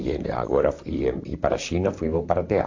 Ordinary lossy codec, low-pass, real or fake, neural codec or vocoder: AAC, 48 kbps; 7.2 kHz; fake; vocoder, 44.1 kHz, 128 mel bands, Pupu-Vocoder